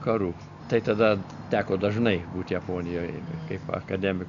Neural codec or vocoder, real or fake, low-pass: none; real; 7.2 kHz